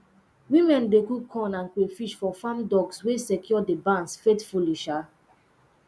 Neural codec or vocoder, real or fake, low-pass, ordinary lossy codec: none; real; none; none